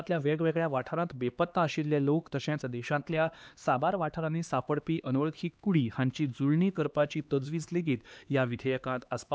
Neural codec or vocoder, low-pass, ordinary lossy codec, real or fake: codec, 16 kHz, 2 kbps, X-Codec, HuBERT features, trained on LibriSpeech; none; none; fake